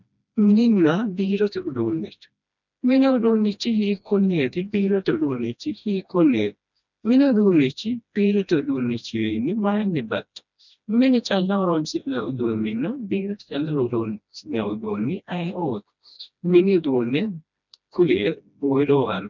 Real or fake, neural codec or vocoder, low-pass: fake; codec, 16 kHz, 1 kbps, FreqCodec, smaller model; 7.2 kHz